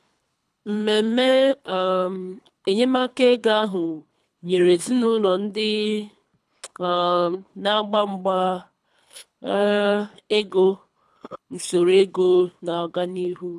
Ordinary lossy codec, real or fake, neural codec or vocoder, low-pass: none; fake; codec, 24 kHz, 3 kbps, HILCodec; none